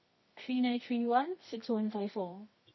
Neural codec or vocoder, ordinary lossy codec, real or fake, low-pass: codec, 24 kHz, 0.9 kbps, WavTokenizer, medium music audio release; MP3, 24 kbps; fake; 7.2 kHz